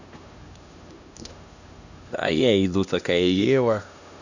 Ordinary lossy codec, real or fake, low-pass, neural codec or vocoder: none; fake; 7.2 kHz; codec, 16 kHz, 1 kbps, X-Codec, HuBERT features, trained on LibriSpeech